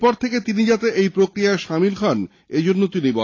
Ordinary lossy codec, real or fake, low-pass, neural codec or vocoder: AAC, 32 kbps; real; 7.2 kHz; none